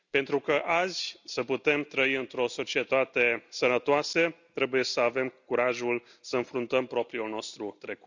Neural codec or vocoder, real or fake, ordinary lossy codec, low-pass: none; real; none; 7.2 kHz